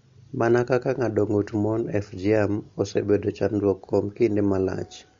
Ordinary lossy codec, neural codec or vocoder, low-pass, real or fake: MP3, 48 kbps; none; 7.2 kHz; real